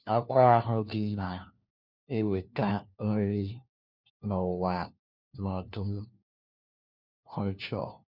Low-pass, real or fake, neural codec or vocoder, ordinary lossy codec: 5.4 kHz; fake; codec, 16 kHz, 1 kbps, FunCodec, trained on LibriTTS, 50 frames a second; none